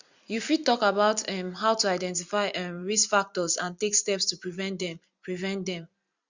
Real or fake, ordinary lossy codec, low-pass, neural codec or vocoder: fake; Opus, 64 kbps; 7.2 kHz; vocoder, 44.1 kHz, 128 mel bands every 256 samples, BigVGAN v2